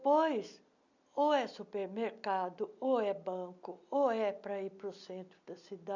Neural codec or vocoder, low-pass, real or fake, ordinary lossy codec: none; 7.2 kHz; real; none